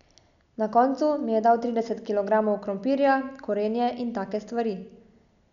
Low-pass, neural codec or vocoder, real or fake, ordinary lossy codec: 7.2 kHz; none; real; none